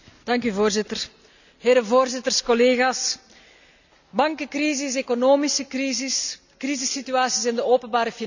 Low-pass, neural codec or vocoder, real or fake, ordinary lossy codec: 7.2 kHz; none; real; none